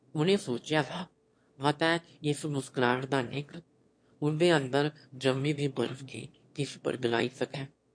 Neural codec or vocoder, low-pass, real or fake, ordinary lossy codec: autoencoder, 22.05 kHz, a latent of 192 numbers a frame, VITS, trained on one speaker; 9.9 kHz; fake; MP3, 48 kbps